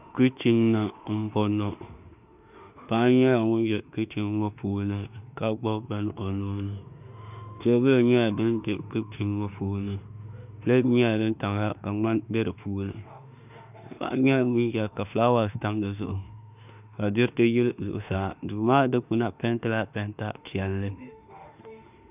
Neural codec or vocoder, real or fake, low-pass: autoencoder, 48 kHz, 32 numbers a frame, DAC-VAE, trained on Japanese speech; fake; 3.6 kHz